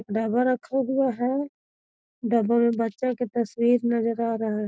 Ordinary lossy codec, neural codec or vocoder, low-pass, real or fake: none; none; none; real